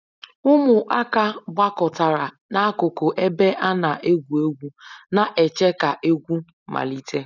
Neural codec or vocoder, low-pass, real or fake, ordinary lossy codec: none; 7.2 kHz; real; none